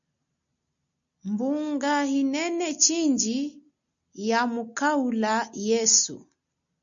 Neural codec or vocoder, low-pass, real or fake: none; 7.2 kHz; real